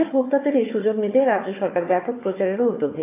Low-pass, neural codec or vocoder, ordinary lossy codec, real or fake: 3.6 kHz; codec, 16 kHz, 4 kbps, FunCodec, trained on Chinese and English, 50 frames a second; AAC, 24 kbps; fake